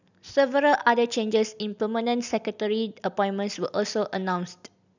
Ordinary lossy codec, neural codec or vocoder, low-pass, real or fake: none; none; 7.2 kHz; real